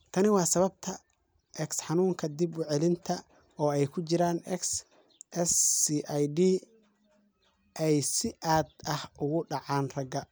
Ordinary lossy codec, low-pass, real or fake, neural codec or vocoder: none; none; real; none